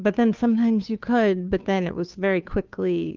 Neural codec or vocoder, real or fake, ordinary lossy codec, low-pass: codec, 16 kHz, 2 kbps, FunCodec, trained on Chinese and English, 25 frames a second; fake; Opus, 24 kbps; 7.2 kHz